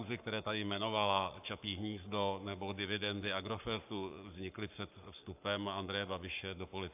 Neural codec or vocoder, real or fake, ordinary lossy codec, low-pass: codec, 44.1 kHz, 7.8 kbps, Pupu-Codec; fake; AAC, 32 kbps; 3.6 kHz